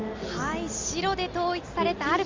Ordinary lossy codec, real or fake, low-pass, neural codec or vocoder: Opus, 32 kbps; real; 7.2 kHz; none